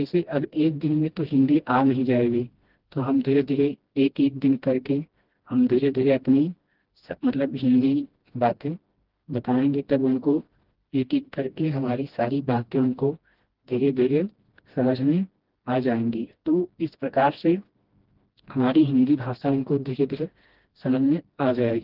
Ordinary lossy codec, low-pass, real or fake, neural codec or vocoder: Opus, 16 kbps; 5.4 kHz; fake; codec, 16 kHz, 1 kbps, FreqCodec, smaller model